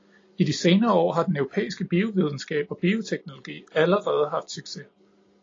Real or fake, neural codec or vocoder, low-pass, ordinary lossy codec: real; none; 7.2 kHz; AAC, 32 kbps